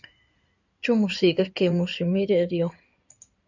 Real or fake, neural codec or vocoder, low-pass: fake; codec, 16 kHz in and 24 kHz out, 2.2 kbps, FireRedTTS-2 codec; 7.2 kHz